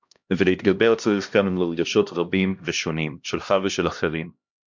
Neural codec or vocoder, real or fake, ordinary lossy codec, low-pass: codec, 16 kHz, 1 kbps, X-Codec, HuBERT features, trained on LibriSpeech; fake; MP3, 64 kbps; 7.2 kHz